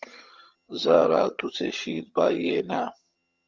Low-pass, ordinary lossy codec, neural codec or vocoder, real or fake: 7.2 kHz; Opus, 32 kbps; vocoder, 22.05 kHz, 80 mel bands, HiFi-GAN; fake